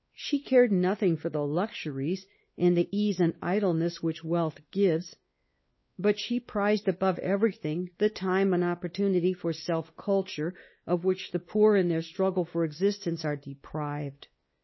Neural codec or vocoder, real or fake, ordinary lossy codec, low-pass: codec, 16 kHz, 2 kbps, X-Codec, WavLM features, trained on Multilingual LibriSpeech; fake; MP3, 24 kbps; 7.2 kHz